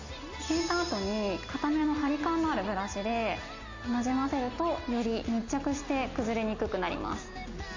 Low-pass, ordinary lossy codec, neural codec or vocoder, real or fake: 7.2 kHz; AAC, 48 kbps; none; real